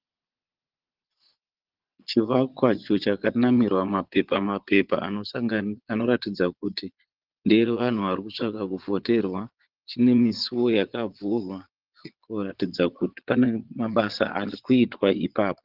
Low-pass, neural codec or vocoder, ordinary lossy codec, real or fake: 5.4 kHz; vocoder, 22.05 kHz, 80 mel bands, Vocos; Opus, 16 kbps; fake